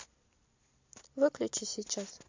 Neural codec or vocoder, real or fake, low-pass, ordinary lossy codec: none; real; 7.2 kHz; MP3, 48 kbps